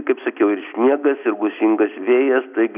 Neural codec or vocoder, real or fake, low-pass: none; real; 3.6 kHz